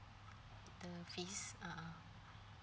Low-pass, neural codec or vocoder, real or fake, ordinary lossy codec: none; none; real; none